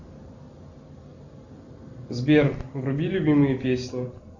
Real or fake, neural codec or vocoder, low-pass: real; none; 7.2 kHz